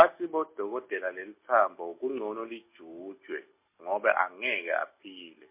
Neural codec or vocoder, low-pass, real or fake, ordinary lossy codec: none; 3.6 kHz; real; MP3, 24 kbps